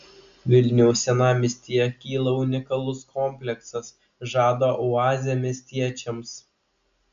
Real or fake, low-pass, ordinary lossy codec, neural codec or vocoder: real; 7.2 kHz; MP3, 64 kbps; none